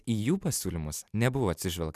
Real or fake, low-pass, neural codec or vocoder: real; 14.4 kHz; none